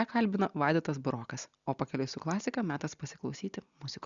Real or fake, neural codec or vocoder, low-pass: real; none; 7.2 kHz